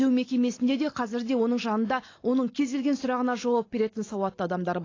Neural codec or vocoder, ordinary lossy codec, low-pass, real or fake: none; AAC, 32 kbps; 7.2 kHz; real